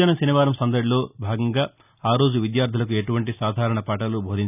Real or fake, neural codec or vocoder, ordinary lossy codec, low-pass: real; none; none; 3.6 kHz